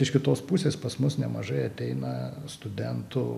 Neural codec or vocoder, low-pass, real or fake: none; 14.4 kHz; real